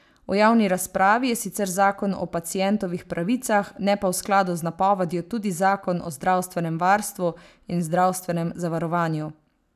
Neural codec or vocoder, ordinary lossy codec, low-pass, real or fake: none; none; 14.4 kHz; real